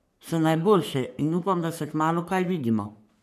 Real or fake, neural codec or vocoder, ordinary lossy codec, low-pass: fake; codec, 44.1 kHz, 3.4 kbps, Pupu-Codec; none; 14.4 kHz